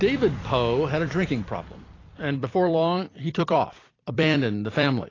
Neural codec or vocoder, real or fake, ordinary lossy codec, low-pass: vocoder, 44.1 kHz, 128 mel bands every 256 samples, BigVGAN v2; fake; AAC, 32 kbps; 7.2 kHz